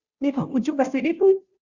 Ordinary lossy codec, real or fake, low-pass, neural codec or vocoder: Opus, 64 kbps; fake; 7.2 kHz; codec, 16 kHz, 0.5 kbps, FunCodec, trained on Chinese and English, 25 frames a second